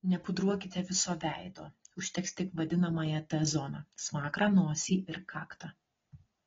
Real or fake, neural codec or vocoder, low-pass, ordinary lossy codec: real; none; 7.2 kHz; AAC, 24 kbps